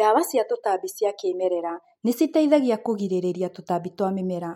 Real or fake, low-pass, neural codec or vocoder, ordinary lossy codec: real; 19.8 kHz; none; MP3, 64 kbps